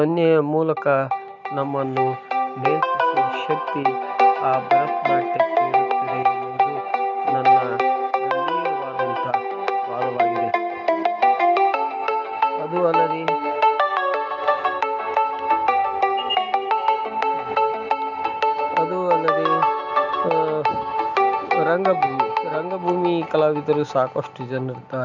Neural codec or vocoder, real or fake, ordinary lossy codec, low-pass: none; real; none; 7.2 kHz